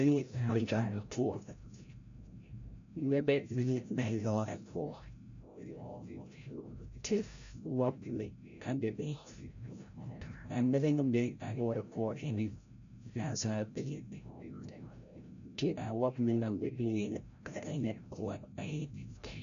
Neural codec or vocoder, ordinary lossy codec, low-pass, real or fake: codec, 16 kHz, 0.5 kbps, FreqCodec, larger model; MP3, 64 kbps; 7.2 kHz; fake